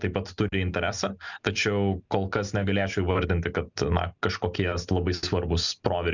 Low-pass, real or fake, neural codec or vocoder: 7.2 kHz; real; none